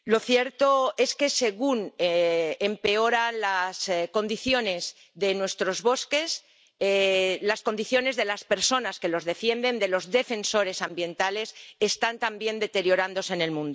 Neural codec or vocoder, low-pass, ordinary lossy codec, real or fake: none; none; none; real